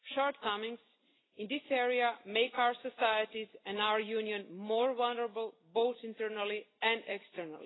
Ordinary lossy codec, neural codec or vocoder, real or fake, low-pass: AAC, 16 kbps; none; real; 7.2 kHz